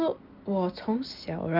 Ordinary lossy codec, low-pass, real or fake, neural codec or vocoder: Opus, 32 kbps; 5.4 kHz; real; none